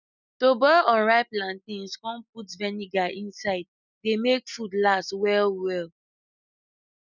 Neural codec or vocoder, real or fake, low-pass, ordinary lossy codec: none; real; 7.2 kHz; none